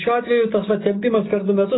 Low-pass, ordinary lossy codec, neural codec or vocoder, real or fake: 7.2 kHz; AAC, 16 kbps; none; real